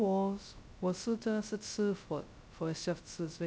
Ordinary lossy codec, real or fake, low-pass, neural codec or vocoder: none; fake; none; codec, 16 kHz, 0.2 kbps, FocalCodec